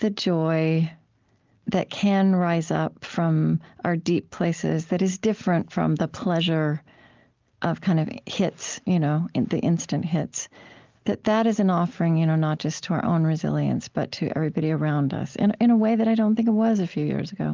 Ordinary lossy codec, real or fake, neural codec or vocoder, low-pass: Opus, 24 kbps; real; none; 7.2 kHz